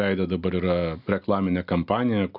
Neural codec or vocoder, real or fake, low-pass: none; real; 5.4 kHz